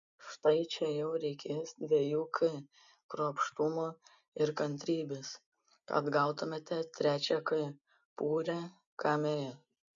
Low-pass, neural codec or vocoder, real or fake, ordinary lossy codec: 7.2 kHz; none; real; MP3, 64 kbps